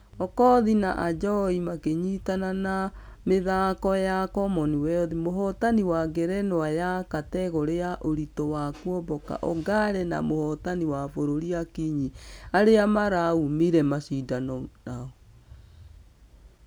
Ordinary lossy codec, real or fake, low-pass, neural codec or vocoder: none; real; none; none